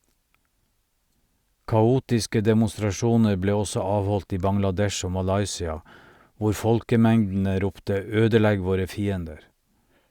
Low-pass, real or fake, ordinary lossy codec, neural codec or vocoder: 19.8 kHz; real; Opus, 64 kbps; none